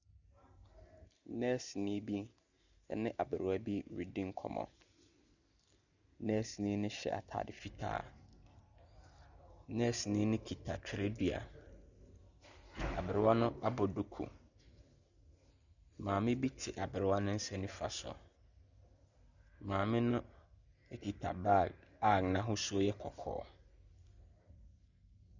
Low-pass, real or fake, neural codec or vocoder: 7.2 kHz; real; none